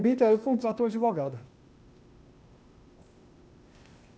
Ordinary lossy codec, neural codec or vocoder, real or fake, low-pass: none; codec, 16 kHz, 0.8 kbps, ZipCodec; fake; none